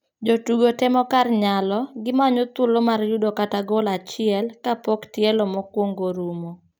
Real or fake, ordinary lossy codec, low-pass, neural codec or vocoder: real; none; none; none